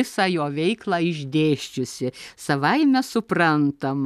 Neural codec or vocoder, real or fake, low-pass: none; real; 14.4 kHz